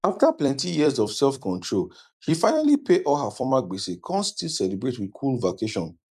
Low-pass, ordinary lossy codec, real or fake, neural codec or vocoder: 14.4 kHz; none; fake; vocoder, 44.1 kHz, 128 mel bands every 256 samples, BigVGAN v2